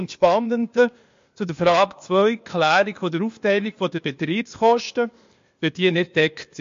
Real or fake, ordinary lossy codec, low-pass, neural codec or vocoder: fake; MP3, 48 kbps; 7.2 kHz; codec, 16 kHz, 0.8 kbps, ZipCodec